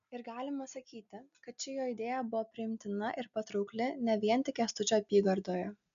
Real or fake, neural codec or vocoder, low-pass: real; none; 7.2 kHz